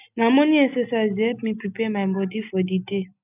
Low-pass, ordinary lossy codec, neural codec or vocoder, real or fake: 3.6 kHz; none; none; real